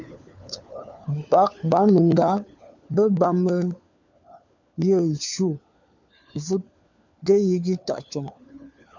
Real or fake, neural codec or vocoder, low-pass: fake; codec, 16 kHz, 4 kbps, FunCodec, trained on LibriTTS, 50 frames a second; 7.2 kHz